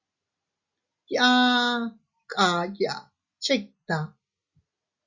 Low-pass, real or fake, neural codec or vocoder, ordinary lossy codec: 7.2 kHz; real; none; Opus, 64 kbps